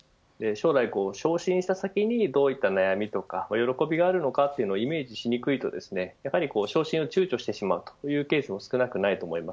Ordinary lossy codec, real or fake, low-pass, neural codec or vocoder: none; real; none; none